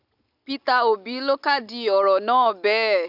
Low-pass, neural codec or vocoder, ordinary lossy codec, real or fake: 5.4 kHz; none; AAC, 48 kbps; real